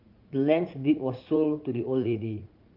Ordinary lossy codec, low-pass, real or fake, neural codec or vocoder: Opus, 24 kbps; 5.4 kHz; fake; vocoder, 44.1 kHz, 80 mel bands, Vocos